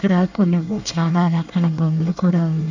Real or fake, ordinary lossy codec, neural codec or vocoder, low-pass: fake; none; codec, 24 kHz, 1 kbps, SNAC; 7.2 kHz